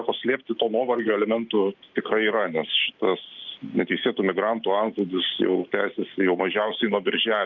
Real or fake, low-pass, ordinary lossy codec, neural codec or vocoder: real; 7.2 kHz; Opus, 24 kbps; none